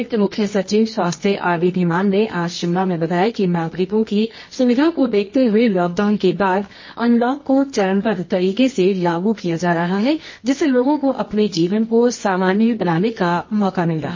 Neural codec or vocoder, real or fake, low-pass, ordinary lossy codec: codec, 24 kHz, 0.9 kbps, WavTokenizer, medium music audio release; fake; 7.2 kHz; MP3, 32 kbps